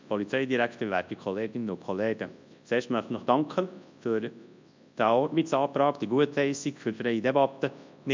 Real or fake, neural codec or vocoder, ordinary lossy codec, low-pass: fake; codec, 24 kHz, 0.9 kbps, WavTokenizer, large speech release; MP3, 64 kbps; 7.2 kHz